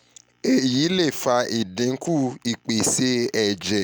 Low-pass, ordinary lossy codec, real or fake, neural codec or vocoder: none; none; real; none